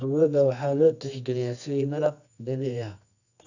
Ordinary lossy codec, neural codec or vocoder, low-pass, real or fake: none; codec, 24 kHz, 0.9 kbps, WavTokenizer, medium music audio release; 7.2 kHz; fake